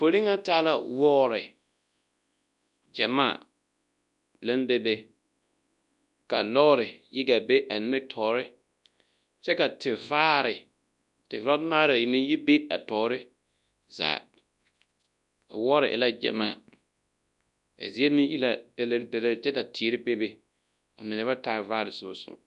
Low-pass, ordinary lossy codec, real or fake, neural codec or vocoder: 10.8 kHz; MP3, 96 kbps; fake; codec, 24 kHz, 0.9 kbps, WavTokenizer, large speech release